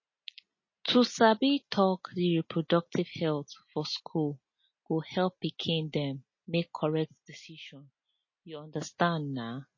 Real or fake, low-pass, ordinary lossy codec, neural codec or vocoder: real; 7.2 kHz; MP3, 32 kbps; none